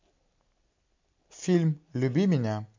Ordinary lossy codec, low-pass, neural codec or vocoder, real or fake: MP3, 48 kbps; 7.2 kHz; none; real